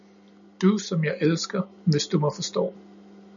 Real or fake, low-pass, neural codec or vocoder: real; 7.2 kHz; none